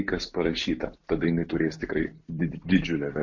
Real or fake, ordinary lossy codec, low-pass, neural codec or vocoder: real; MP3, 48 kbps; 7.2 kHz; none